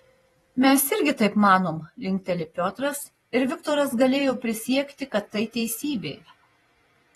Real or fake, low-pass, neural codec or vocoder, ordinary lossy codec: fake; 19.8 kHz; vocoder, 48 kHz, 128 mel bands, Vocos; AAC, 32 kbps